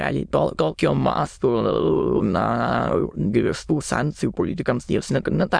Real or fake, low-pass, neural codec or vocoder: fake; 9.9 kHz; autoencoder, 22.05 kHz, a latent of 192 numbers a frame, VITS, trained on many speakers